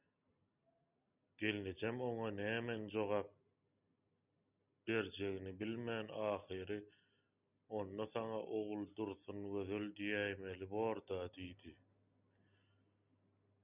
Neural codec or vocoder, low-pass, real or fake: none; 3.6 kHz; real